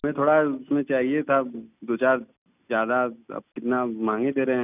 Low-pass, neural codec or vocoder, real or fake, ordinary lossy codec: 3.6 kHz; none; real; none